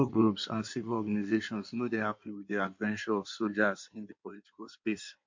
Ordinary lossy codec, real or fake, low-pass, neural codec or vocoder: MP3, 48 kbps; fake; 7.2 kHz; codec, 16 kHz in and 24 kHz out, 1.1 kbps, FireRedTTS-2 codec